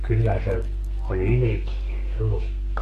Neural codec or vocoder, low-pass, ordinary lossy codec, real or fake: codec, 32 kHz, 1.9 kbps, SNAC; 14.4 kHz; AAC, 48 kbps; fake